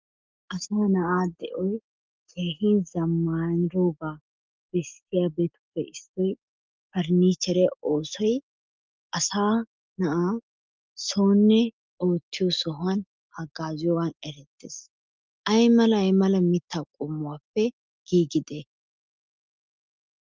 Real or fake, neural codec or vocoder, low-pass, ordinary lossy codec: real; none; 7.2 kHz; Opus, 24 kbps